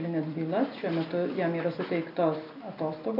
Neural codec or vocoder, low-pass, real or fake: none; 5.4 kHz; real